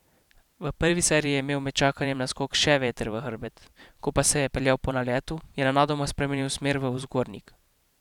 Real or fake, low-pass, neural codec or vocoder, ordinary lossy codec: fake; 19.8 kHz; vocoder, 44.1 kHz, 128 mel bands every 512 samples, BigVGAN v2; none